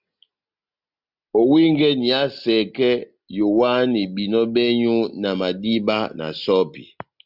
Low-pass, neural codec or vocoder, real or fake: 5.4 kHz; none; real